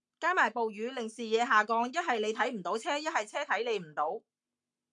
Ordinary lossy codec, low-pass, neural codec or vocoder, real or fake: MP3, 64 kbps; 10.8 kHz; codec, 44.1 kHz, 7.8 kbps, Pupu-Codec; fake